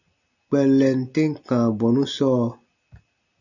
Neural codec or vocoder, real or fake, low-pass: none; real; 7.2 kHz